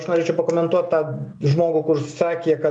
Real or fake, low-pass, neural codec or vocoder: real; 9.9 kHz; none